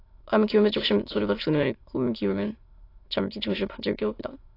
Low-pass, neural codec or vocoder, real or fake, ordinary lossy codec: 5.4 kHz; autoencoder, 22.05 kHz, a latent of 192 numbers a frame, VITS, trained on many speakers; fake; AAC, 32 kbps